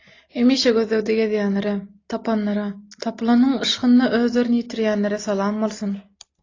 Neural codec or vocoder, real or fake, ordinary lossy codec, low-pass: none; real; AAC, 32 kbps; 7.2 kHz